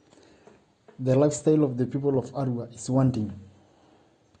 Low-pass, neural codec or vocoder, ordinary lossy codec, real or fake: 9.9 kHz; none; AAC, 32 kbps; real